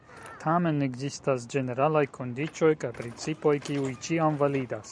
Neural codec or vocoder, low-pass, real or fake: none; 9.9 kHz; real